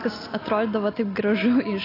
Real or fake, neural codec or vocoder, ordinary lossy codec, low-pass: real; none; AAC, 24 kbps; 5.4 kHz